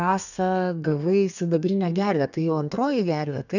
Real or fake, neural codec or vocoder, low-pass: fake; codec, 32 kHz, 1.9 kbps, SNAC; 7.2 kHz